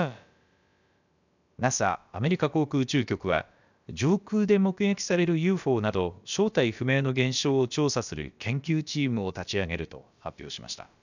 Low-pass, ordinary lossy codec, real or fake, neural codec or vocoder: 7.2 kHz; none; fake; codec, 16 kHz, about 1 kbps, DyCAST, with the encoder's durations